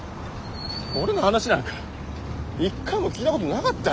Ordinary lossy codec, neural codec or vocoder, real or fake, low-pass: none; none; real; none